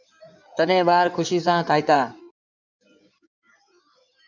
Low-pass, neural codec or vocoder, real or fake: 7.2 kHz; codec, 16 kHz in and 24 kHz out, 2.2 kbps, FireRedTTS-2 codec; fake